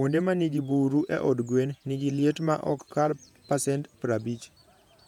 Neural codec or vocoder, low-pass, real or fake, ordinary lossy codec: vocoder, 48 kHz, 128 mel bands, Vocos; 19.8 kHz; fake; none